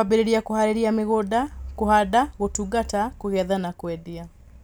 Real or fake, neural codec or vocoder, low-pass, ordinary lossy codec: real; none; none; none